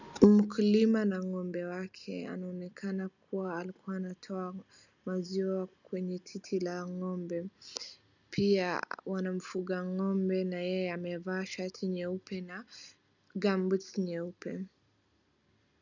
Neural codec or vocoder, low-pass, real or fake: none; 7.2 kHz; real